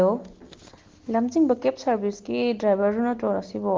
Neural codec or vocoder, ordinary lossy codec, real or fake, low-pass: none; Opus, 16 kbps; real; 7.2 kHz